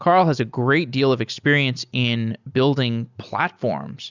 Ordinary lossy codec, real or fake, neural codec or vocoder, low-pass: Opus, 64 kbps; real; none; 7.2 kHz